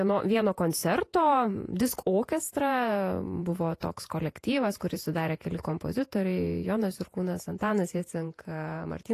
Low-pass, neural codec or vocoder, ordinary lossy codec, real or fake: 14.4 kHz; vocoder, 48 kHz, 128 mel bands, Vocos; AAC, 48 kbps; fake